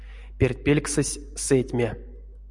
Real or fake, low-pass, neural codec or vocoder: real; 10.8 kHz; none